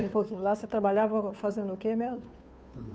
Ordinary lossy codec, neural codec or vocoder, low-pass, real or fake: none; codec, 16 kHz, 2 kbps, FunCodec, trained on Chinese and English, 25 frames a second; none; fake